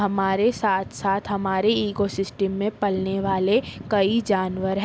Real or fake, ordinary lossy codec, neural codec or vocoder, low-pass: real; none; none; none